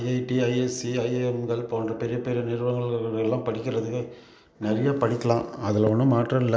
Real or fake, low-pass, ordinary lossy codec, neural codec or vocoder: real; none; none; none